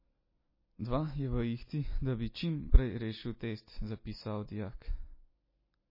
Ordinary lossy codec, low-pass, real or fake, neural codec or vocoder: MP3, 24 kbps; 5.4 kHz; real; none